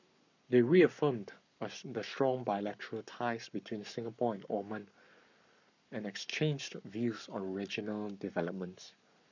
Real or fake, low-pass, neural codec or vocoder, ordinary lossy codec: fake; 7.2 kHz; codec, 44.1 kHz, 7.8 kbps, Pupu-Codec; none